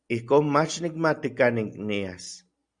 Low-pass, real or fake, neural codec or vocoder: 9.9 kHz; real; none